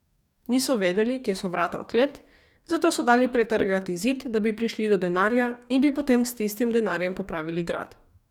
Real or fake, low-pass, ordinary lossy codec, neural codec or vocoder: fake; 19.8 kHz; none; codec, 44.1 kHz, 2.6 kbps, DAC